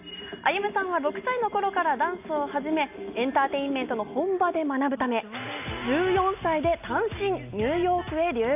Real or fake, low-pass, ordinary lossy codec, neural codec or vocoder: real; 3.6 kHz; AAC, 32 kbps; none